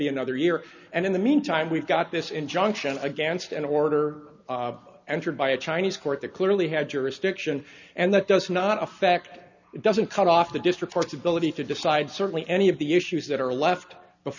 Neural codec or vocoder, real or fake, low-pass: none; real; 7.2 kHz